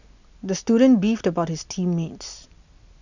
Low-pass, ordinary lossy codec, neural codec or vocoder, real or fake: 7.2 kHz; none; none; real